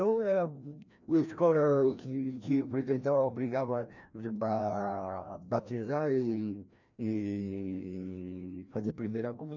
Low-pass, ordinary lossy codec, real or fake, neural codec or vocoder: 7.2 kHz; none; fake; codec, 16 kHz, 1 kbps, FreqCodec, larger model